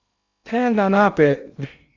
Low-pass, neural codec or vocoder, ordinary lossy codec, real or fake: 7.2 kHz; codec, 16 kHz in and 24 kHz out, 0.6 kbps, FocalCodec, streaming, 2048 codes; Opus, 64 kbps; fake